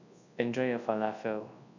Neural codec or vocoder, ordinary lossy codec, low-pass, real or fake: codec, 24 kHz, 0.9 kbps, WavTokenizer, large speech release; none; 7.2 kHz; fake